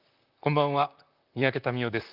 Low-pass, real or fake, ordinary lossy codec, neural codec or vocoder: 5.4 kHz; fake; Opus, 24 kbps; vocoder, 44.1 kHz, 128 mel bands, Pupu-Vocoder